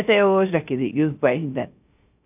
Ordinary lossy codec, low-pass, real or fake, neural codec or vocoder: AAC, 32 kbps; 3.6 kHz; fake; codec, 16 kHz, 0.3 kbps, FocalCodec